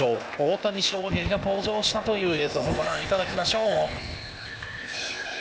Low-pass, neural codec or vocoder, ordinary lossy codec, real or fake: none; codec, 16 kHz, 0.8 kbps, ZipCodec; none; fake